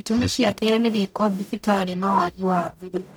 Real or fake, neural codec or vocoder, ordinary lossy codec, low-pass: fake; codec, 44.1 kHz, 0.9 kbps, DAC; none; none